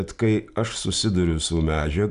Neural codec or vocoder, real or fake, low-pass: none; real; 10.8 kHz